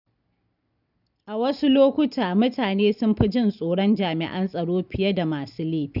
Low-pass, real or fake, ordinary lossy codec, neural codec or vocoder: 5.4 kHz; real; none; none